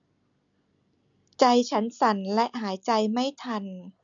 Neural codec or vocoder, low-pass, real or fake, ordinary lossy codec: none; 7.2 kHz; real; none